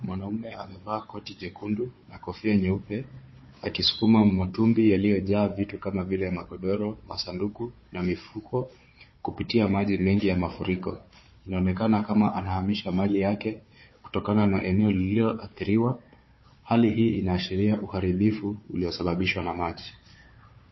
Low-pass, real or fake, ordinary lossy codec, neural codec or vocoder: 7.2 kHz; fake; MP3, 24 kbps; codec, 16 kHz, 4 kbps, FunCodec, trained on Chinese and English, 50 frames a second